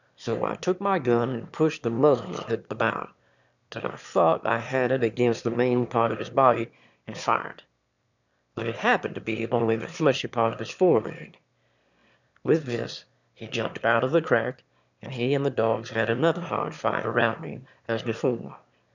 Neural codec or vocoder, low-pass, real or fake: autoencoder, 22.05 kHz, a latent of 192 numbers a frame, VITS, trained on one speaker; 7.2 kHz; fake